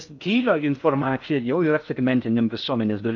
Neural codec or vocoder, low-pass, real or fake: codec, 16 kHz in and 24 kHz out, 0.6 kbps, FocalCodec, streaming, 2048 codes; 7.2 kHz; fake